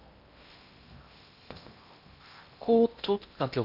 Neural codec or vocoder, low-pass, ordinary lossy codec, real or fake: codec, 16 kHz in and 24 kHz out, 0.8 kbps, FocalCodec, streaming, 65536 codes; 5.4 kHz; none; fake